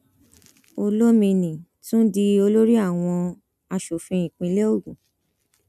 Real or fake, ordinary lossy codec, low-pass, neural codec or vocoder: real; none; 14.4 kHz; none